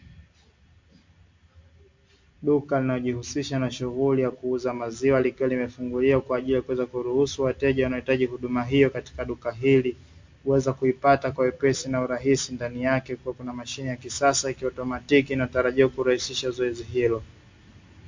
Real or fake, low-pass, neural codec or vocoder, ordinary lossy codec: real; 7.2 kHz; none; MP3, 48 kbps